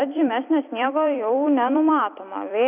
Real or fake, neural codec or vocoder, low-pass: fake; vocoder, 44.1 kHz, 128 mel bands every 256 samples, BigVGAN v2; 3.6 kHz